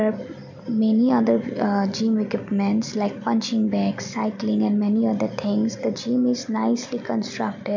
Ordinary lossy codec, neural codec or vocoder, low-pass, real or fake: MP3, 64 kbps; none; 7.2 kHz; real